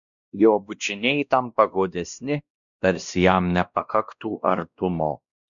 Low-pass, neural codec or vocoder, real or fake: 7.2 kHz; codec, 16 kHz, 1 kbps, X-Codec, WavLM features, trained on Multilingual LibriSpeech; fake